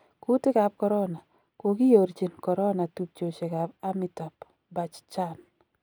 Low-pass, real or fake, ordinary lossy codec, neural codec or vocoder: none; real; none; none